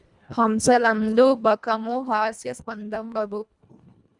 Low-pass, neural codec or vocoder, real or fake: 10.8 kHz; codec, 24 kHz, 1.5 kbps, HILCodec; fake